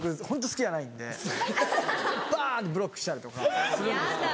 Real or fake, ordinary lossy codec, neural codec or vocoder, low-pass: real; none; none; none